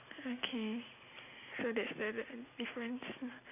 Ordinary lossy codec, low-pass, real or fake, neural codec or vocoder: none; 3.6 kHz; real; none